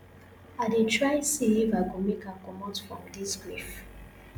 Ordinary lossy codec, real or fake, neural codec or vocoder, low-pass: none; real; none; none